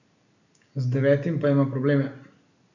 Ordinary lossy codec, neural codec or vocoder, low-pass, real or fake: none; none; 7.2 kHz; real